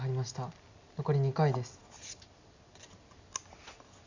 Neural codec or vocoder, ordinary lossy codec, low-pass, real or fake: none; Opus, 64 kbps; 7.2 kHz; real